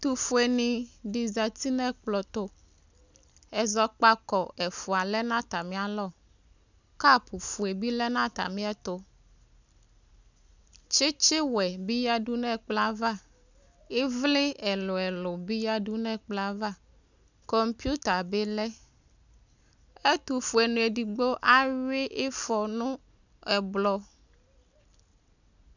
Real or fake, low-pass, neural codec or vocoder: real; 7.2 kHz; none